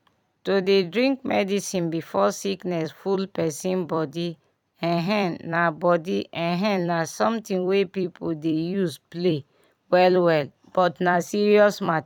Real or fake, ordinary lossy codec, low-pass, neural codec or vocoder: fake; none; 19.8 kHz; vocoder, 44.1 kHz, 128 mel bands every 256 samples, BigVGAN v2